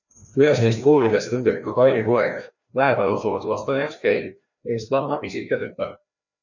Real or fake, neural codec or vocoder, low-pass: fake; codec, 16 kHz, 1 kbps, FreqCodec, larger model; 7.2 kHz